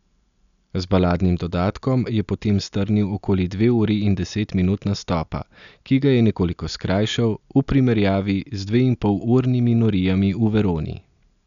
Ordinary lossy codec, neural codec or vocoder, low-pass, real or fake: none; none; 7.2 kHz; real